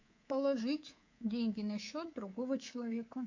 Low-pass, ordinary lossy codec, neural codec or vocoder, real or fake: 7.2 kHz; MP3, 48 kbps; codec, 24 kHz, 3.1 kbps, DualCodec; fake